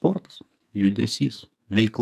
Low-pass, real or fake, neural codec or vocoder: 14.4 kHz; fake; codec, 44.1 kHz, 2.6 kbps, SNAC